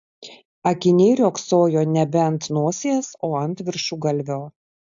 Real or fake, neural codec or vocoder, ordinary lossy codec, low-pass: real; none; AAC, 64 kbps; 7.2 kHz